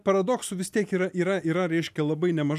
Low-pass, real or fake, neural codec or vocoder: 14.4 kHz; real; none